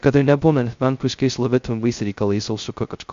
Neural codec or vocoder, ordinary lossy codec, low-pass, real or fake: codec, 16 kHz, 0.2 kbps, FocalCodec; MP3, 48 kbps; 7.2 kHz; fake